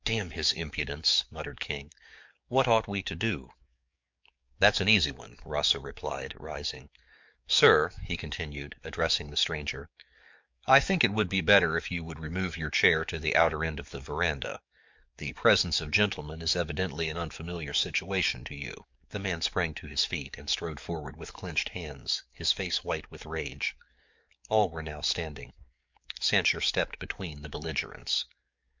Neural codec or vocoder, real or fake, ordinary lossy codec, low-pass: codec, 44.1 kHz, 7.8 kbps, DAC; fake; MP3, 64 kbps; 7.2 kHz